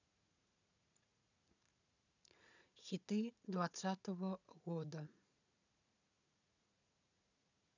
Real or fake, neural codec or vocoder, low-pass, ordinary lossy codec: fake; vocoder, 44.1 kHz, 80 mel bands, Vocos; 7.2 kHz; none